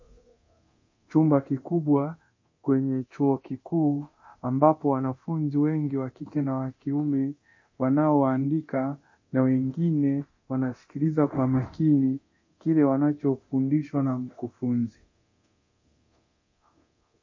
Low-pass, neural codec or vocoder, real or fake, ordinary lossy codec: 7.2 kHz; codec, 24 kHz, 0.9 kbps, DualCodec; fake; MP3, 32 kbps